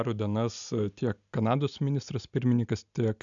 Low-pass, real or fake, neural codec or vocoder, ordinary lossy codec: 7.2 kHz; real; none; MP3, 96 kbps